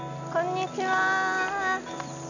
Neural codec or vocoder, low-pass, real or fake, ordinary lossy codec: none; 7.2 kHz; real; none